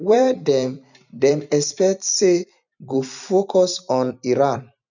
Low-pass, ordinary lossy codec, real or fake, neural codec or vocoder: 7.2 kHz; none; fake; vocoder, 44.1 kHz, 128 mel bands every 256 samples, BigVGAN v2